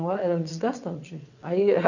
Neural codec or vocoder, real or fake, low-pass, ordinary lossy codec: vocoder, 22.05 kHz, 80 mel bands, WaveNeXt; fake; 7.2 kHz; none